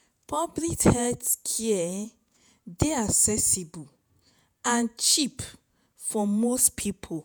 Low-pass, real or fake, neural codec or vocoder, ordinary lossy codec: none; fake; vocoder, 48 kHz, 128 mel bands, Vocos; none